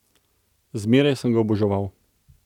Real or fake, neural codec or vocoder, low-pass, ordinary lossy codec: real; none; 19.8 kHz; none